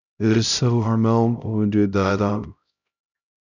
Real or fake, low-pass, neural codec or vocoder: fake; 7.2 kHz; codec, 16 kHz, 0.5 kbps, X-Codec, HuBERT features, trained on LibriSpeech